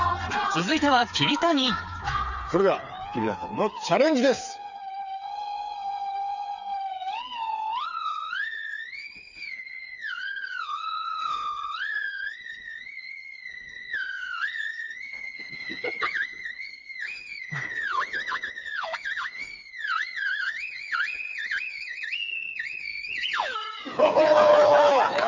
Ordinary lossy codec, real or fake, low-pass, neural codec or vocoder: none; fake; 7.2 kHz; codec, 16 kHz, 4 kbps, FreqCodec, smaller model